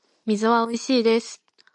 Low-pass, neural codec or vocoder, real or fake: 10.8 kHz; none; real